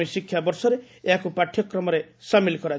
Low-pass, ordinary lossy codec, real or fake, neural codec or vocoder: none; none; real; none